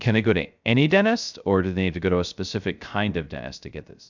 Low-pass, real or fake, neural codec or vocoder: 7.2 kHz; fake; codec, 16 kHz, 0.3 kbps, FocalCodec